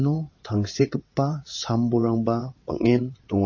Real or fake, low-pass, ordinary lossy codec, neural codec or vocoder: fake; 7.2 kHz; MP3, 32 kbps; vocoder, 22.05 kHz, 80 mel bands, WaveNeXt